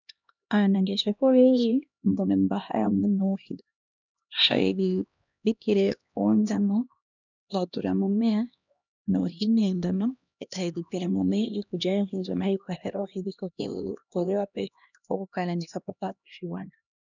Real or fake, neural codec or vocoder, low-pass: fake; codec, 16 kHz, 1 kbps, X-Codec, HuBERT features, trained on LibriSpeech; 7.2 kHz